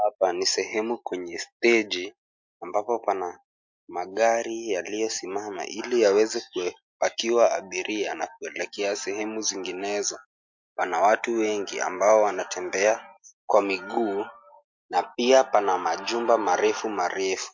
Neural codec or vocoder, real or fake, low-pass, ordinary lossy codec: none; real; 7.2 kHz; MP3, 48 kbps